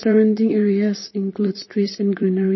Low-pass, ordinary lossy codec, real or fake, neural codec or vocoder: 7.2 kHz; MP3, 24 kbps; fake; vocoder, 44.1 kHz, 128 mel bands, Pupu-Vocoder